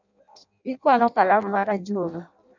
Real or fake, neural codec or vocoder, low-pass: fake; codec, 16 kHz in and 24 kHz out, 0.6 kbps, FireRedTTS-2 codec; 7.2 kHz